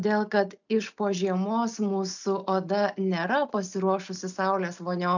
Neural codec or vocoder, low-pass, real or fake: none; 7.2 kHz; real